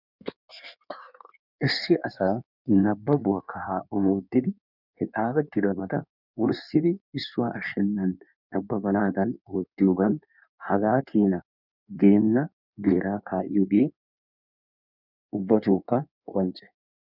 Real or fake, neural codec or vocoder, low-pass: fake; codec, 16 kHz in and 24 kHz out, 1.1 kbps, FireRedTTS-2 codec; 5.4 kHz